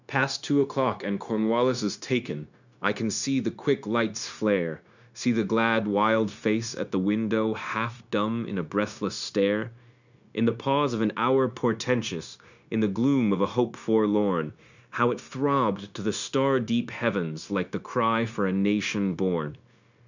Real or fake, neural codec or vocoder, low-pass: fake; codec, 16 kHz, 0.9 kbps, LongCat-Audio-Codec; 7.2 kHz